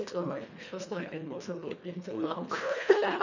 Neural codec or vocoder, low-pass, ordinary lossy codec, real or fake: codec, 24 kHz, 1.5 kbps, HILCodec; 7.2 kHz; none; fake